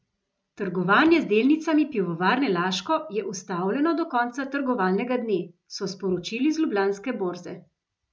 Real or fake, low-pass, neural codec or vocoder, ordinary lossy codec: real; none; none; none